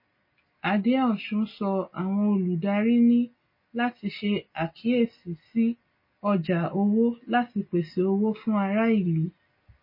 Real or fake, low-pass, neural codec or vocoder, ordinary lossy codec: real; 5.4 kHz; none; MP3, 24 kbps